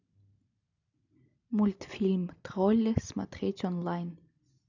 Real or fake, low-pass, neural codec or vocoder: real; 7.2 kHz; none